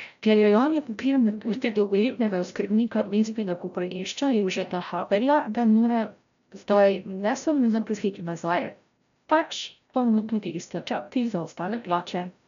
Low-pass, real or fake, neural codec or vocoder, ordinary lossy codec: 7.2 kHz; fake; codec, 16 kHz, 0.5 kbps, FreqCodec, larger model; none